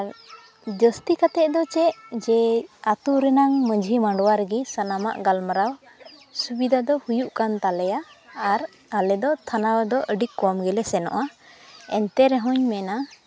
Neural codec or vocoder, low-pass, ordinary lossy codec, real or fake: none; none; none; real